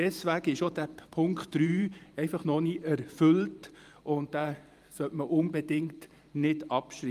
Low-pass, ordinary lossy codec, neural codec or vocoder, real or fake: 14.4 kHz; Opus, 32 kbps; vocoder, 44.1 kHz, 128 mel bands every 512 samples, BigVGAN v2; fake